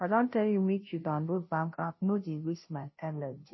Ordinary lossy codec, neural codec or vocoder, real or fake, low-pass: MP3, 24 kbps; codec, 16 kHz, 0.5 kbps, FunCodec, trained on Chinese and English, 25 frames a second; fake; 7.2 kHz